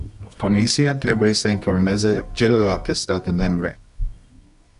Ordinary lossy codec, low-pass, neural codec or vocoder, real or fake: AAC, 96 kbps; 10.8 kHz; codec, 24 kHz, 0.9 kbps, WavTokenizer, medium music audio release; fake